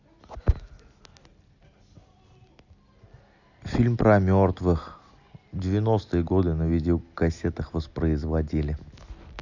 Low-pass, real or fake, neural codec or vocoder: 7.2 kHz; real; none